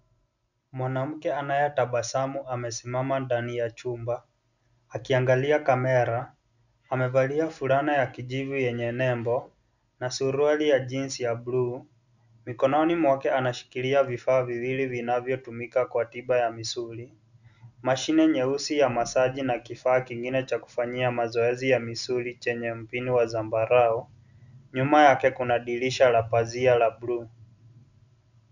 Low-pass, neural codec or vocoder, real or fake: 7.2 kHz; none; real